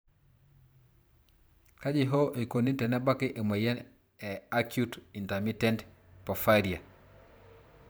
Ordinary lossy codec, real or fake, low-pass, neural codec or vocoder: none; fake; none; vocoder, 44.1 kHz, 128 mel bands every 512 samples, BigVGAN v2